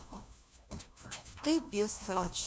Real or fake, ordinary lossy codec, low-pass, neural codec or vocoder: fake; none; none; codec, 16 kHz, 1 kbps, FunCodec, trained on LibriTTS, 50 frames a second